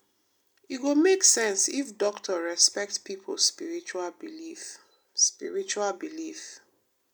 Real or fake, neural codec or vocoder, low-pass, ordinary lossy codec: real; none; none; none